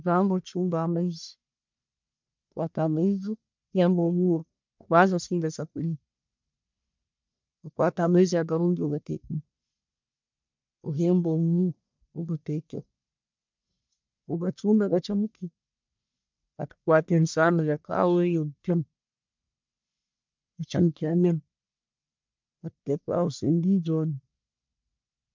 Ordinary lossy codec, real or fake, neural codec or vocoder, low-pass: MP3, 64 kbps; fake; codec, 44.1 kHz, 1.7 kbps, Pupu-Codec; 7.2 kHz